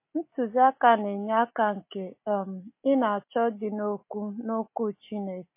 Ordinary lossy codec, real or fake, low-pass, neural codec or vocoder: MP3, 24 kbps; real; 3.6 kHz; none